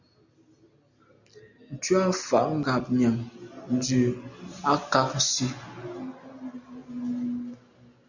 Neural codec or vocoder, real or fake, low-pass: none; real; 7.2 kHz